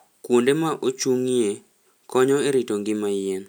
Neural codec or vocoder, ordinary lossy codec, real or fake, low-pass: none; none; real; none